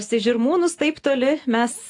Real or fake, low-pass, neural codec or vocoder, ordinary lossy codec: real; 10.8 kHz; none; AAC, 64 kbps